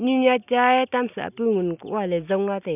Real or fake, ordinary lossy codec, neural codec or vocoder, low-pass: real; none; none; 3.6 kHz